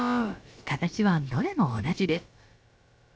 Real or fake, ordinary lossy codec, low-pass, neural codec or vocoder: fake; none; none; codec, 16 kHz, about 1 kbps, DyCAST, with the encoder's durations